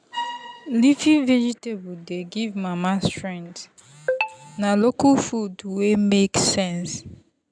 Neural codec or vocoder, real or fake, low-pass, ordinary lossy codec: none; real; 9.9 kHz; MP3, 96 kbps